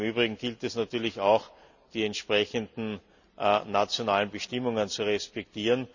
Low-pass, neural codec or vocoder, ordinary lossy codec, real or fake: 7.2 kHz; none; none; real